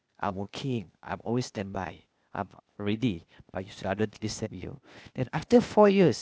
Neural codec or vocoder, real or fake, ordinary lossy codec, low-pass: codec, 16 kHz, 0.8 kbps, ZipCodec; fake; none; none